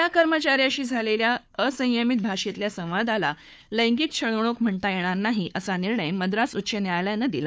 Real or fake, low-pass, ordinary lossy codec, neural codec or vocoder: fake; none; none; codec, 16 kHz, 4 kbps, FunCodec, trained on Chinese and English, 50 frames a second